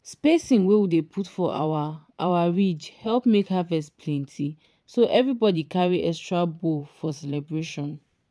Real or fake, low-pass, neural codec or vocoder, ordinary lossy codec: fake; none; vocoder, 22.05 kHz, 80 mel bands, Vocos; none